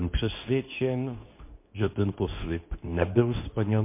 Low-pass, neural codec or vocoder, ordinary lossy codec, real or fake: 3.6 kHz; codec, 24 kHz, 0.9 kbps, WavTokenizer, medium speech release version 2; MP3, 24 kbps; fake